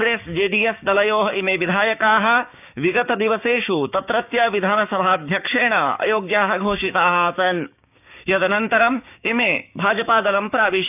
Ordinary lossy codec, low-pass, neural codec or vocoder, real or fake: none; 3.6 kHz; codec, 16 kHz, 6 kbps, DAC; fake